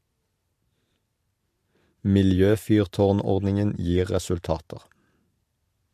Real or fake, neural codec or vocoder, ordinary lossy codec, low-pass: real; none; MP3, 64 kbps; 14.4 kHz